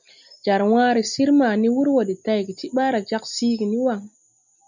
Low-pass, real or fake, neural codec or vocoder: 7.2 kHz; real; none